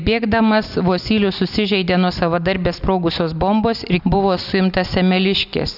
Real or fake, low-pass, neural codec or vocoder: real; 5.4 kHz; none